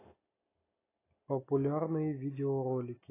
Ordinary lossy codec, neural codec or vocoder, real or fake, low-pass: AAC, 16 kbps; none; real; 3.6 kHz